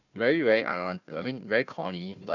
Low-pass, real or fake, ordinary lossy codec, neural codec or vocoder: 7.2 kHz; fake; none; codec, 16 kHz, 1 kbps, FunCodec, trained on Chinese and English, 50 frames a second